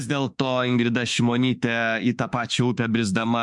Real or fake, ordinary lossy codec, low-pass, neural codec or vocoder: fake; MP3, 96 kbps; 10.8 kHz; autoencoder, 48 kHz, 32 numbers a frame, DAC-VAE, trained on Japanese speech